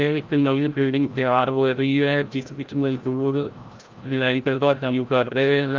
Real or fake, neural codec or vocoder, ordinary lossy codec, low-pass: fake; codec, 16 kHz, 0.5 kbps, FreqCodec, larger model; Opus, 32 kbps; 7.2 kHz